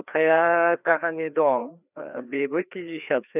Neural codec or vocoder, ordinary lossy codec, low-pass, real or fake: codec, 16 kHz, 2 kbps, FreqCodec, larger model; none; 3.6 kHz; fake